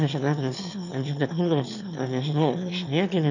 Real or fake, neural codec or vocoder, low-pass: fake; autoencoder, 22.05 kHz, a latent of 192 numbers a frame, VITS, trained on one speaker; 7.2 kHz